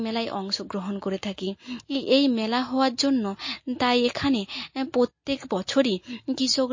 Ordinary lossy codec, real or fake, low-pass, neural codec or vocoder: MP3, 32 kbps; real; 7.2 kHz; none